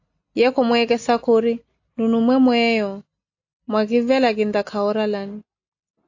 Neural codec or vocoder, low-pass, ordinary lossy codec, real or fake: none; 7.2 kHz; AAC, 48 kbps; real